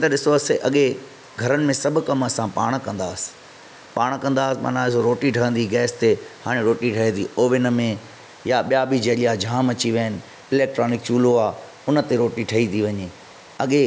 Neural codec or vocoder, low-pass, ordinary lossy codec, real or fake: none; none; none; real